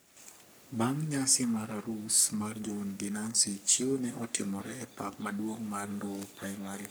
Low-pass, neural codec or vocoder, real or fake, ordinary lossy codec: none; codec, 44.1 kHz, 3.4 kbps, Pupu-Codec; fake; none